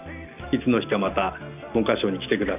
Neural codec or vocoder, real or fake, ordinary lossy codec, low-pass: none; real; none; 3.6 kHz